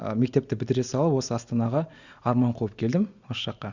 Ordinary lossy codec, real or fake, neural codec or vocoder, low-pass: Opus, 64 kbps; real; none; 7.2 kHz